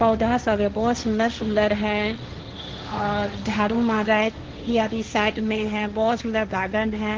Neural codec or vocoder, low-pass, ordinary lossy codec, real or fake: codec, 16 kHz, 1.1 kbps, Voila-Tokenizer; 7.2 kHz; Opus, 16 kbps; fake